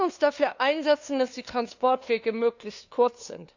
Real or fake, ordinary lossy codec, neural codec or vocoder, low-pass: fake; Opus, 64 kbps; codec, 16 kHz, 2 kbps, FunCodec, trained on LibriTTS, 25 frames a second; 7.2 kHz